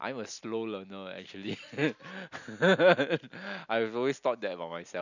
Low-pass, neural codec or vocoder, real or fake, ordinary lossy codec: 7.2 kHz; none; real; none